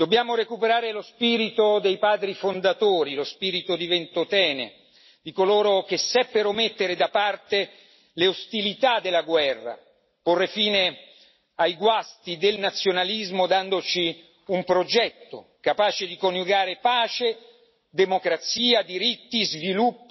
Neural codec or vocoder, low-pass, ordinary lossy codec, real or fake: none; 7.2 kHz; MP3, 24 kbps; real